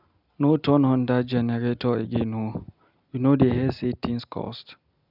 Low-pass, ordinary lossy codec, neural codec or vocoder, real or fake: 5.4 kHz; none; none; real